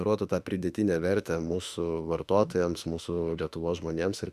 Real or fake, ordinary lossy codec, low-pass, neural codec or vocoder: fake; Opus, 64 kbps; 14.4 kHz; autoencoder, 48 kHz, 32 numbers a frame, DAC-VAE, trained on Japanese speech